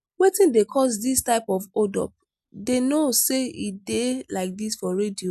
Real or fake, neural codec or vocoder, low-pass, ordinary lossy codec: real; none; 14.4 kHz; none